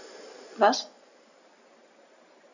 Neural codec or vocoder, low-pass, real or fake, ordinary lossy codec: none; 7.2 kHz; real; none